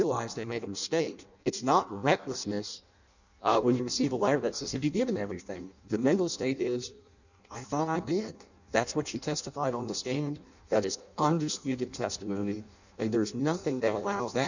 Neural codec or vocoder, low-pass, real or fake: codec, 16 kHz in and 24 kHz out, 0.6 kbps, FireRedTTS-2 codec; 7.2 kHz; fake